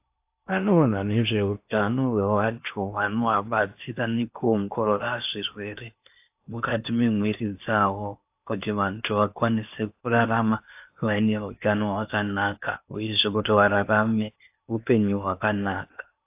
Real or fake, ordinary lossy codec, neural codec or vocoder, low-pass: fake; AAC, 32 kbps; codec, 16 kHz in and 24 kHz out, 0.8 kbps, FocalCodec, streaming, 65536 codes; 3.6 kHz